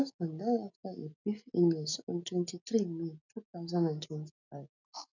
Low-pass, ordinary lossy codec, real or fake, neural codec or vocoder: 7.2 kHz; none; real; none